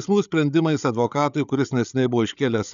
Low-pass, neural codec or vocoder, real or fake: 7.2 kHz; codec, 16 kHz, 8 kbps, FreqCodec, larger model; fake